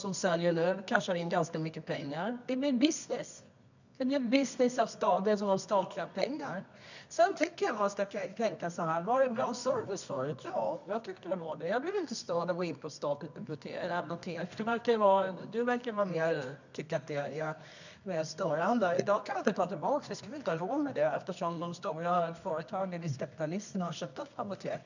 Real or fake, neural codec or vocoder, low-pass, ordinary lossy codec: fake; codec, 24 kHz, 0.9 kbps, WavTokenizer, medium music audio release; 7.2 kHz; none